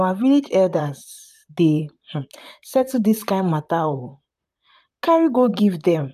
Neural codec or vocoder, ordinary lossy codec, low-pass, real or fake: vocoder, 44.1 kHz, 128 mel bands, Pupu-Vocoder; none; 14.4 kHz; fake